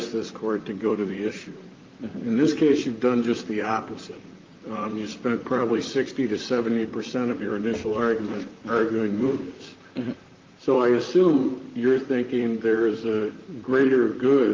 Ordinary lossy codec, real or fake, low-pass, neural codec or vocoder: Opus, 24 kbps; fake; 7.2 kHz; vocoder, 44.1 kHz, 128 mel bands, Pupu-Vocoder